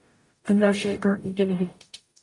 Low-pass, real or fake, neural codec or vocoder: 10.8 kHz; fake; codec, 44.1 kHz, 0.9 kbps, DAC